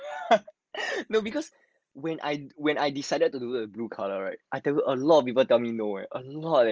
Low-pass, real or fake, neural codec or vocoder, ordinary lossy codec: 7.2 kHz; real; none; Opus, 24 kbps